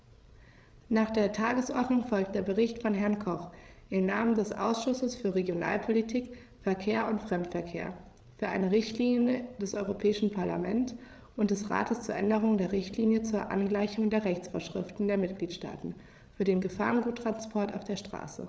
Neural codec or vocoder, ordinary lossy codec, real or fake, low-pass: codec, 16 kHz, 8 kbps, FreqCodec, larger model; none; fake; none